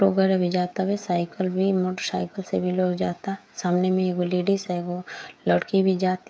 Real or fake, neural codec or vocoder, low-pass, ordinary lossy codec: fake; codec, 16 kHz, 16 kbps, FreqCodec, smaller model; none; none